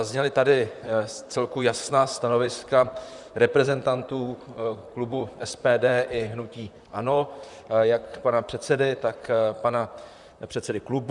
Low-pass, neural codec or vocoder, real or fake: 10.8 kHz; vocoder, 44.1 kHz, 128 mel bands, Pupu-Vocoder; fake